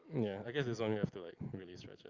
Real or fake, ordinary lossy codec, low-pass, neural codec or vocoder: real; Opus, 32 kbps; 7.2 kHz; none